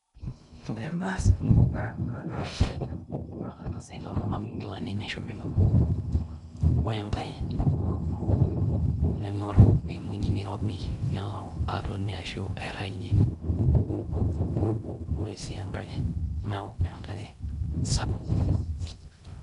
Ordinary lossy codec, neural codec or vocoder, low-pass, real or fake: none; codec, 16 kHz in and 24 kHz out, 0.6 kbps, FocalCodec, streaming, 2048 codes; 10.8 kHz; fake